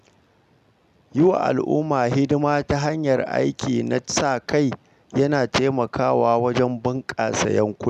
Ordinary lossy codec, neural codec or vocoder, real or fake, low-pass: none; none; real; 14.4 kHz